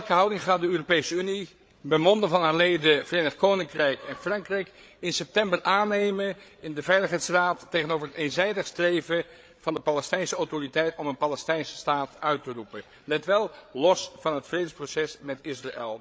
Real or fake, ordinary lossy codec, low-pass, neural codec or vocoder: fake; none; none; codec, 16 kHz, 8 kbps, FreqCodec, larger model